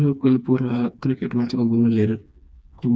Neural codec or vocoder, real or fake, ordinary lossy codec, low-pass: codec, 16 kHz, 2 kbps, FreqCodec, smaller model; fake; none; none